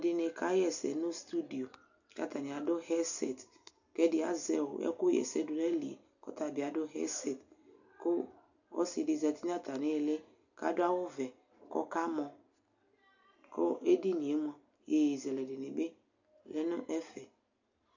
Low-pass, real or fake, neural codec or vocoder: 7.2 kHz; real; none